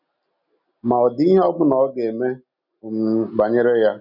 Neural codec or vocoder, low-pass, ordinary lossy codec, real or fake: none; 5.4 kHz; none; real